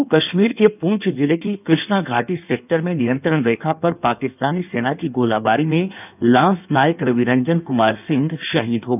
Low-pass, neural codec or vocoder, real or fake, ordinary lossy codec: 3.6 kHz; codec, 16 kHz in and 24 kHz out, 1.1 kbps, FireRedTTS-2 codec; fake; none